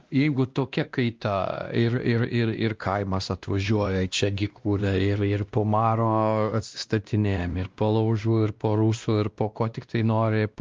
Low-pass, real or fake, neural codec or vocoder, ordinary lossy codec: 7.2 kHz; fake; codec, 16 kHz, 1 kbps, X-Codec, WavLM features, trained on Multilingual LibriSpeech; Opus, 24 kbps